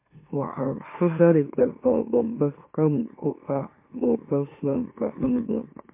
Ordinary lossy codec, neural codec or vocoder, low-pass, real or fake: MP3, 24 kbps; autoencoder, 44.1 kHz, a latent of 192 numbers a frame, MeloTTS; 3.6 kHz; fake